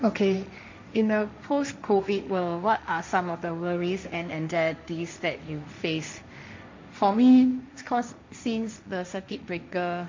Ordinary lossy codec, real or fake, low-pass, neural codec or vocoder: MP3, 64 kbps; fake; 7.2 kHz; codec, 16 kHz, 1.1 kbps, Voila-Tokenizer